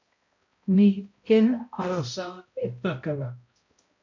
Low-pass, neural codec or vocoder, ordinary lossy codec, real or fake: 7.2 kHz; codec, 16 kHz, 0.5 kbps, X-Codec, HuBERT features, trained on balanced general audio; MP3, 48 kbps; fake